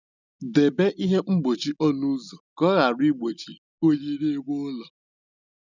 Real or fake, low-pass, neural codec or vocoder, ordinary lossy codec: real; 7.2 kHz; none; none